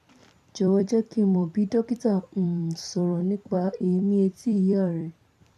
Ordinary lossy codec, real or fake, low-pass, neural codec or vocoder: none; fake; 14.4 kHz; vocoder, 44.1 kHz, 128 mel bands every 256 samples, BigVGAN v2